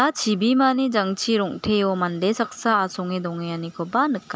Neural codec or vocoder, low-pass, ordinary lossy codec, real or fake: none; none; none; real